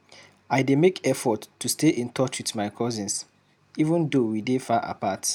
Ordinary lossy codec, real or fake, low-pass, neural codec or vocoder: none; real; 19.8 kHz; none